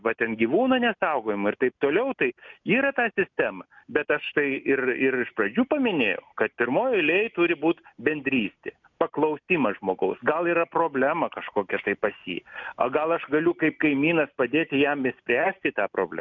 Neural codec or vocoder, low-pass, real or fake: none; 7.2 kHz; real